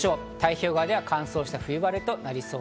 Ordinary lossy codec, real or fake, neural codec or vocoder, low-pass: none; real; none; none